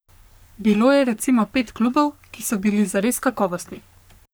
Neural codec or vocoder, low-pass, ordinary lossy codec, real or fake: codec, 44.1 kHz, 3.4 kbps, Pupu-Codec; none; none; fake